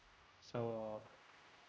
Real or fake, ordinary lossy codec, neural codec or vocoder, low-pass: fake; none; codec, 16 kHz, 0.5 kbps, X-Codec, HuBERT features, trained on general audio; none